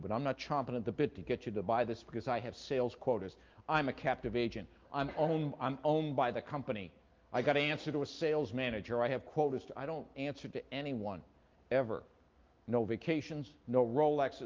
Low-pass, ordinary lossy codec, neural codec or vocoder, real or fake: 7.2 kHz; Opus, 32 kbps; none; real